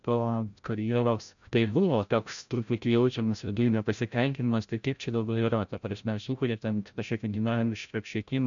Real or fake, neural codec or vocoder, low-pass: fake; codec, 16 kHz, 0.5 kbps, FreqCodec, larger model; 7.2 kHz